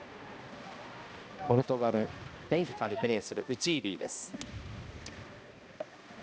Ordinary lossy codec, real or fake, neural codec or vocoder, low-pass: none; fake; codec, 16 kHz, 1 kbps, X-Codec, HuBERT features, trained on balanced general audio; none